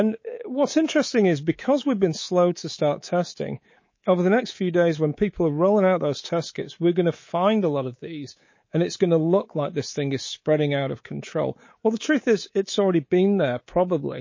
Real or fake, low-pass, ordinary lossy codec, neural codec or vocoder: fake; 7.2 kHz; MP3, 32 kbps; codec, 24 kHz, 3.1 kbps, DualCodec